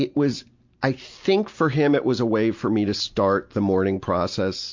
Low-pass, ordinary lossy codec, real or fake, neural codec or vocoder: 7.2 kHz; MP3, 48 kbps; real; none